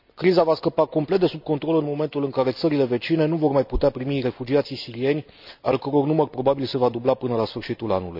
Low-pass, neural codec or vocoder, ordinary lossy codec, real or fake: 5.4 kHz; none; none; real